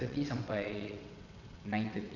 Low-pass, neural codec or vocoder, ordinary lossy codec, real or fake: 7.2 kHz; vocoder, 22.05 kHz, 80 mel bands, WaveNeXt; none; fake